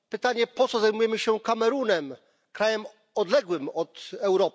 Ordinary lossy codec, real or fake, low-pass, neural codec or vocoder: none; real; none; none